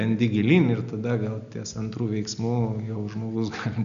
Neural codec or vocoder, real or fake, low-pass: none; real; 7.2 kHz